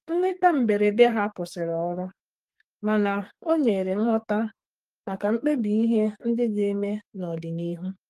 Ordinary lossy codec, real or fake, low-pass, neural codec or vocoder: Opus, 32 kbps; fake; 14.4 kHz; codec, 44.1 kHz, 2.6 kbps, SNAC